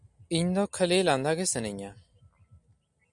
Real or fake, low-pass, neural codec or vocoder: real; 10.8 kHz; none